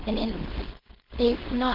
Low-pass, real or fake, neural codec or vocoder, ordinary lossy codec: 5.4 kHz; fake; codec, 16 kHz, 4.8 kbps, FACodec; Opus, 16 kbps